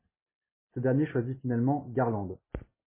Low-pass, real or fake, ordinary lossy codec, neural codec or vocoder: 3.6 kHz; real; MP3, 16 kbps; none